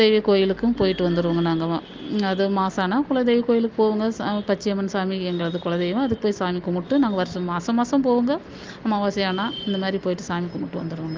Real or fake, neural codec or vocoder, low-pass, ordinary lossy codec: real; none; 7.2 kHz; Opus, 24 kbps